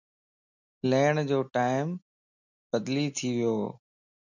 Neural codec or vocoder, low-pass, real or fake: none; 7.2 kHz; real